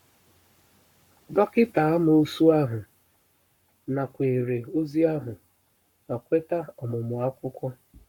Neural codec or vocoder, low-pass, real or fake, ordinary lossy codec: codec, 44.1 kHz, 7.8 kbps, Pupu-Codec; 19.8 kHz; fake; MP3, 96 kbps